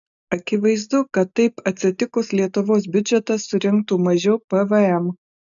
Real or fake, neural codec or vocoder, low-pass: real; none; 7.2 kHz